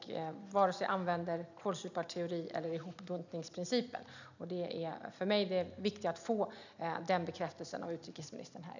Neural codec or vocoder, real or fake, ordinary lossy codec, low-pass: none; real; none; 7.2 kHz